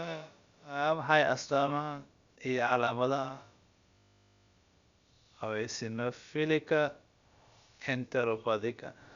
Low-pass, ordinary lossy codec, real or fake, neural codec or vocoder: 7.2 kHz; Opus, 64 kbps; fake; codec, 16 kHz, about 1 kbps, DyCAST, with the encoder's durations